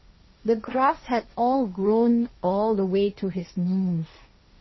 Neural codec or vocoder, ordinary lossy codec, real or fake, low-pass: codec, 16 kHz, 1.1 kbps, Voila-Tokenizer; MP3, 24 kbps; fake; 7.2 kHz